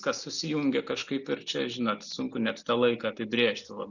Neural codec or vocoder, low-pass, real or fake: none; 7.2 kHz; real